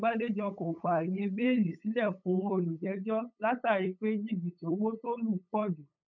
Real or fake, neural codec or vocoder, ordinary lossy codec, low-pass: fake; codec, 16 kHz, 8 kbps, FunCodec, trained on LibriTTS, 25 frames a second; none; 7.2 kHz